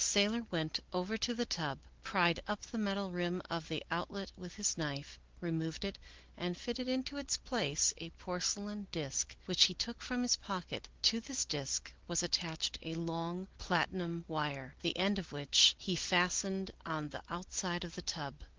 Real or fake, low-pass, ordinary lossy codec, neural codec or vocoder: real; 7.2 kHz; Opus, 16 kbps; none